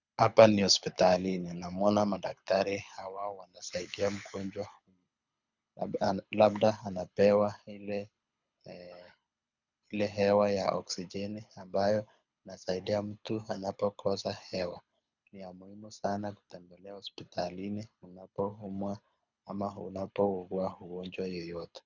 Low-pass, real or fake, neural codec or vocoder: 7.2 kHz; fake; codec, 24 kHz, 6 kbps, HILCodec